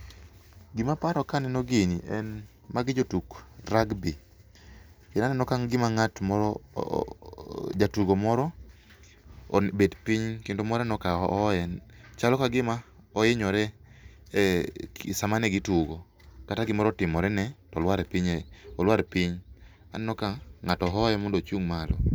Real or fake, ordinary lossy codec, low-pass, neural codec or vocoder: real; none; none; none